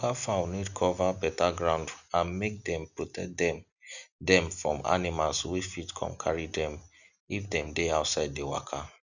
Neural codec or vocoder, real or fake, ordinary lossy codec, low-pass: none; real; none; 7.2 kHz